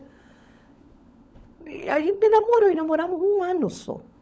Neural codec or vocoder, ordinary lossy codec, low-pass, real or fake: codec, 16 kHz, 16 kbps, FunCodec, trained on LibriTTS, 50 frames a second; none; none; fake